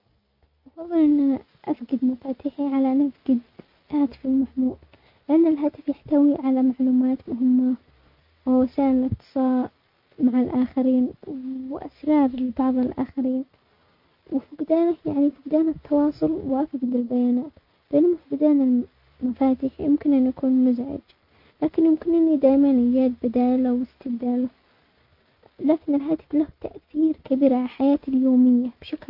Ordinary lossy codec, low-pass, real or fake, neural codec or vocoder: MP3, 48 kbps; 5.4 kHz; real; none